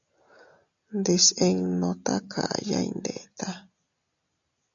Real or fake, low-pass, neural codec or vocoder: real; 7.2 kHz; none